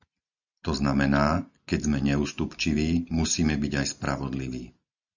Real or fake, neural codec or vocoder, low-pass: real; none; 7.2 kHz